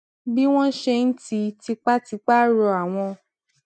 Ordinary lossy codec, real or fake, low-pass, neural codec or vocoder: none; real; none; none